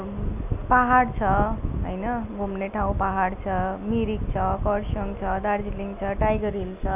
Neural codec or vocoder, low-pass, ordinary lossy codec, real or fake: none; 3.6 kHz; none; real